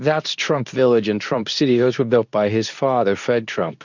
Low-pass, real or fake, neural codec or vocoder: 7.2 kHz; fake; codec, 24 kHz, 0.9 kbps, WavTokenizer, medium speech release version 2